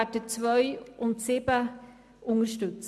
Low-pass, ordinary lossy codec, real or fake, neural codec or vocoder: none; none; real; none